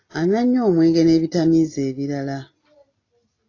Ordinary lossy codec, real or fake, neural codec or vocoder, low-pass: AAC, 32 kbps; real; none; 7.2 kHz